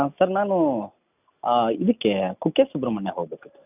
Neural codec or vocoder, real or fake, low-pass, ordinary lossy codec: none; real; 3.6 kHz; none